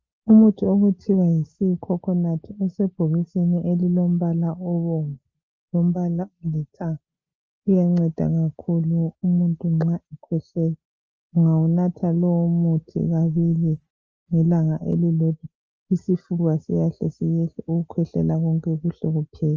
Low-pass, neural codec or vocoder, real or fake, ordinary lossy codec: 7.2 kHz; none; real; Opus, 24 kbps